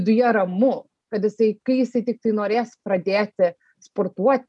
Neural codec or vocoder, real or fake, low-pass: none; real; 10.8 kHz